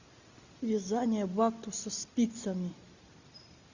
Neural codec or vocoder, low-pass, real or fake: none; 7.2 kHz; real